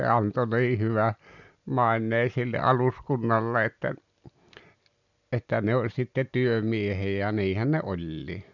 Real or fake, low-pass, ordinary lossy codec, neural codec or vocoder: real; 7.2 kHz; none; none